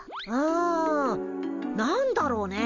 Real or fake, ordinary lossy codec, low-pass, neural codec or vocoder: real; none; 7.2 kHz; none